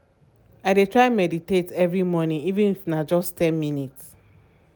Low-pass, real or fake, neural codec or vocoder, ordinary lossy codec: none; real; none; none